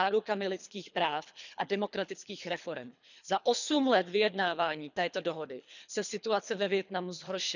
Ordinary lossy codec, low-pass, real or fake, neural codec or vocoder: none; 7.2 kHz; fake; codec, 24 kHz, 3 kbps, HILCodec